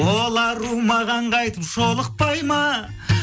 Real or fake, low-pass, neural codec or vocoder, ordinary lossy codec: real; none; none; none